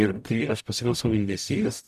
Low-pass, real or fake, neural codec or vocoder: 14.4 kHz; fake; codec, 44.1 kHz, 0.9 kbps, DAC